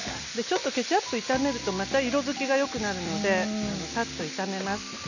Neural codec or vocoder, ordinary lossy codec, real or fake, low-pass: none; none; real; 7.2 kHz